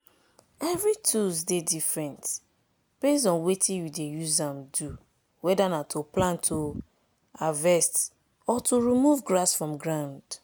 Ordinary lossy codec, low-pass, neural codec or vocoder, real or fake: none; none; none; real